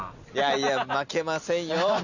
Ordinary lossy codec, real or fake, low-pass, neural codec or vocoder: none; real; 7.2 kHz; none